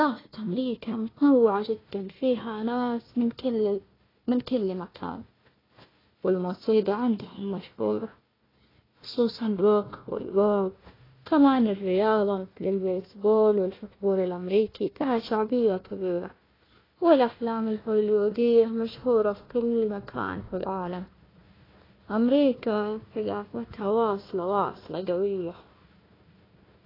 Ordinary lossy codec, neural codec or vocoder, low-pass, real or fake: AAC, 24 kbps; codec, 16 kHz, 1 kbps, FunCodec, trained on Chinese and English, 50 frames a second; 5.4 kHz; fake